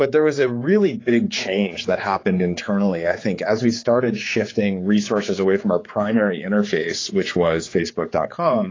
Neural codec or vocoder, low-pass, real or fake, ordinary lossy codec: codec, 16 kHz, 4 kbps, X-Codec, HuBERT features, trained on general audio; 7.2 kHz; fake; AAC, 32 kbps